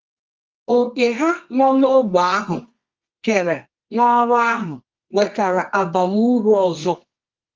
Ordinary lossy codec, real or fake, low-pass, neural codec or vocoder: Opus, 32 kbps; fake; 7.2 kHz; codec, 24 kHz, 0.9 kbps, WavTokenizer, medium music audio release